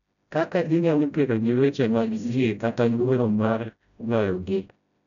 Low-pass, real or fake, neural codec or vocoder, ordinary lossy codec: 7.2 kHz; fake; codec, 16 kHz, 0.5 kbps, FreqCodec, smaller model; none